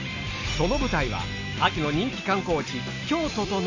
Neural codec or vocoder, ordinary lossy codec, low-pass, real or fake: none; AAC, 48 kbps; 7.2 kHz; real